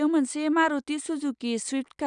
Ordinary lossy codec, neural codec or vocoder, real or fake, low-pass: none; none; real; 9.9 kHz